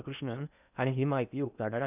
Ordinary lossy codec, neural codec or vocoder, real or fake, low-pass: none; codec, 16 kHz in and 24 kHz out, 0.8 kbps, FocalCodec, streaming, 65536 codes; fake; 3.6 kHz